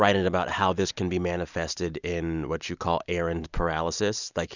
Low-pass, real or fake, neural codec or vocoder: 7.2 kHz; real; none